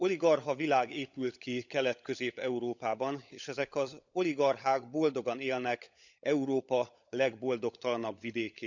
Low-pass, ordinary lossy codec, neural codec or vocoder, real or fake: 7.2 kHz; none; codec, 16 kHz, 16 kbps, FunCodec, trained on LibriTTS, 50 frames a second; fake